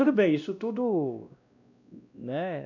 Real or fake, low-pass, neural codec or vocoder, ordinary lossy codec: fake; 7.2 kHz; codec, 16 kHz, 1 kbps, X-Codec, WavLM features, trained on Multilingual LibriSpeech; none